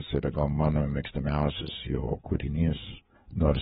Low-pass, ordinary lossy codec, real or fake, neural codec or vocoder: 7.2 kHz; AAC, 16 kbps; fake; codec, 16 kHz, 4 kbps, FreqCodec, larger model